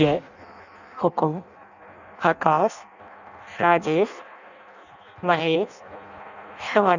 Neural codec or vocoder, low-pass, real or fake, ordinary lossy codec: codec, 16 kHz in and 24 kHz out, 0.6 kbps, FireRedTTS-2 codec; 7.2 kHz; fake; none